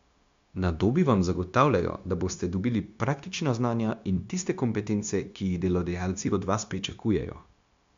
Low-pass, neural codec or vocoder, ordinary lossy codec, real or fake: 7.2 kHz; codec, 16 kHz, 0.9 kbps, LongCat-Audio-Codec; MP3, 64 kbps; fake